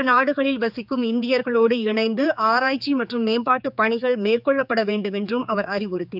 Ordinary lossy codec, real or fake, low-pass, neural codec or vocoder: none; fake; 5.4 kHz; codec, 16 kHz, 4 kbps, X-Codec, HuBERT features, trained on general audio